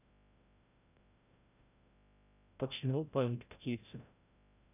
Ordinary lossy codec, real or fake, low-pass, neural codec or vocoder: none; fake; 3.6 kHz; codec, 16 kHz, 0.5 kbps, FreqCodec, larger model